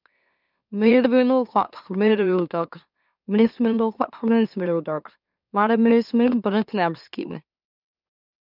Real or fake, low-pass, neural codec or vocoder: fake; 5.4 kHz; autoencoder, 44.1 kHz, a latent of 192 numbers a frame, MeloTTS